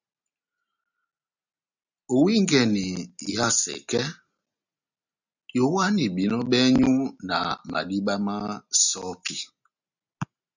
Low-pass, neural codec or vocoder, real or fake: 7.2 kHz; none; real